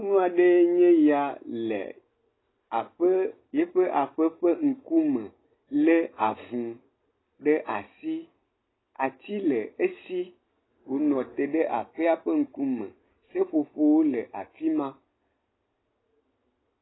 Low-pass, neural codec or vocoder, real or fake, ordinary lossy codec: 7.2 kHz; none; real; AAC, 16 kbps